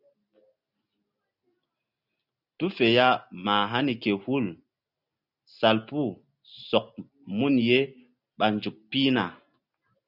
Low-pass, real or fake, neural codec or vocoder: 5.4 kHz; real; none